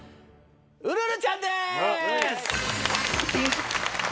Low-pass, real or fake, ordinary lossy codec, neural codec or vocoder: none; real; none; none